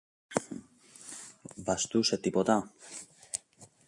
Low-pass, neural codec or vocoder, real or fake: 10.8 kHz; none; real